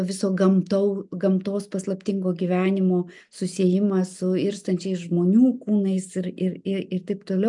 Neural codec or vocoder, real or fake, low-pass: none; real; 10.8 kHz